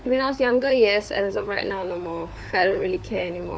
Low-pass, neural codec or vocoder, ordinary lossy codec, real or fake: none; codec, 16 kHz, 4 kbps, FunCodec, trained on LibriTTS, 50 frames a second; none; fake